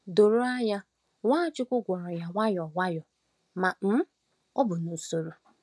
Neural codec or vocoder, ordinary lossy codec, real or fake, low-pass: none; none; real; none